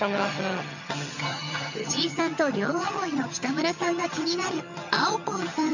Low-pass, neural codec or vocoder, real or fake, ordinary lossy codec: 7.2 kHz; vocoder, 22.05 kHz, 80 mel bands, HiFi-GAN; fake; none